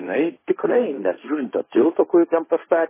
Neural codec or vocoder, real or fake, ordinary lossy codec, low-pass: codec, 16 kHz, 1.1 kbps, Voila-Tokenizer; fake; MP3, 16 kbps; 3.6 kHz